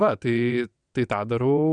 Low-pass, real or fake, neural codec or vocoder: 9.9 kHz; fake; vocoder, 22.05 kHz, 80 mel bands, WaveNeXt